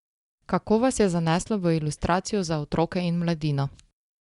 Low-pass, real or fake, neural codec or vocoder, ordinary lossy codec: 10.8 kHz; real; none; Opus, 64 kbps